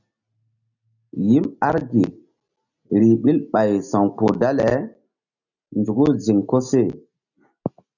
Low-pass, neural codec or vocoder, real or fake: 7.2 kHz; none; real